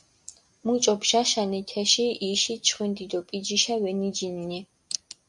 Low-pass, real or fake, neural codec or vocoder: 10.8 kHz; real; none